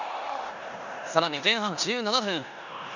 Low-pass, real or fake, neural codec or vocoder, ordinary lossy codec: 7.2 kHz; fake; codec, 16 kHz in and 24 kHz out, 0.9 kbps, LongCat-Audio-Codec, four codebook decoder; none